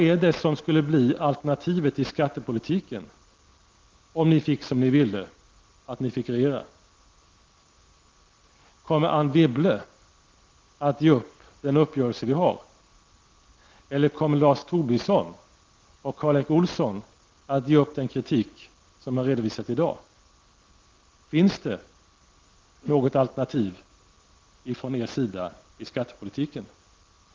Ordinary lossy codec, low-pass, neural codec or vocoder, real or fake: Opus, 16 kbps; 7.2 kHz; none; real